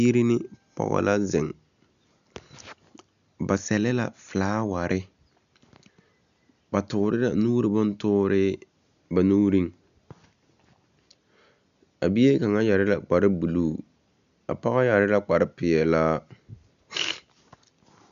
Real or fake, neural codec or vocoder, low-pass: real; none; 7.2 kHz